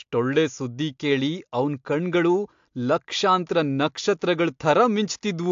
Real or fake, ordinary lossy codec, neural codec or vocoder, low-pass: real; AAC, 64 kbps; none; 7.2 kHz